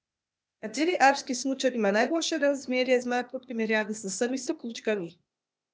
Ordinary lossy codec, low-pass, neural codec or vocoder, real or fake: none; none; codec, 16 kHz, 0.8 kbps, ZipCodec; fake